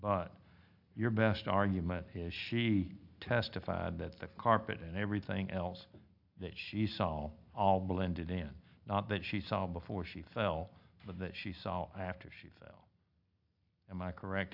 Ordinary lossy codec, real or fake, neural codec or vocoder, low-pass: AAC, 48 kbps; real; none; 5.4 kHz